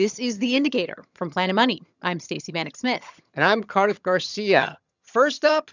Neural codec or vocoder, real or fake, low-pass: vocoder, 22.05 kHz, 80 mel bands, HiFi-GAN; fake; 7.2 kHz